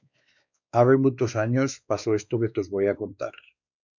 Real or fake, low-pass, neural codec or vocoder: fake; 7.2 kHz; codec, 16 kHz, 2 kbps, X-Codec, WavLM features, trained on Multilingual LibriSpeech